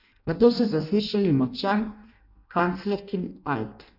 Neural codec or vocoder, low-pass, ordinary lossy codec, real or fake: codec, 16 kHz in and 24 kHz out, 0.6 kbps, FireRedTTS-2 codec; 5.4 kHz; none; fake